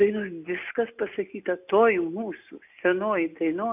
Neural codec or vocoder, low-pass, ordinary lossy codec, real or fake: none; 3.6 kHz; AAC, 32 kbps; real